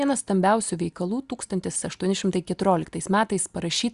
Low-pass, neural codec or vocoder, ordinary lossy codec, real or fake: 10.8 kHz; none; Opus, 64 kbps; real